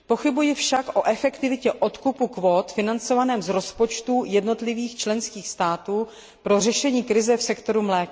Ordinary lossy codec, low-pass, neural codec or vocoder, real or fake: none; none; none; real